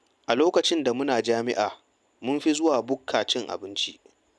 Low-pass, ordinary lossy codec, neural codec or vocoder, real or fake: none; none; none; real